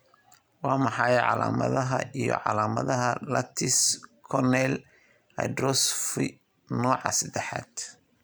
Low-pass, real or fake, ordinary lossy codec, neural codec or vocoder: none; real; none; none